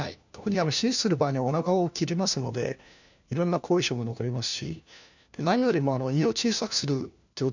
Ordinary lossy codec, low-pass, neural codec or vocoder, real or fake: none; 7.2 kHz; codec, 16 kHz, 1 kbps, FunCodec, trained on LibriTTS, 50 frames a second; fake